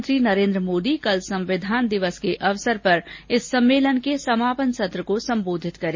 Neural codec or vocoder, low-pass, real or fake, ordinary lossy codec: none; 7.2 kHz; real; MP3, 32 kbps